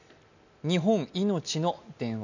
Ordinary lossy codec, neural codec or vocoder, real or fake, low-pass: none; none; real; 7.2 kHz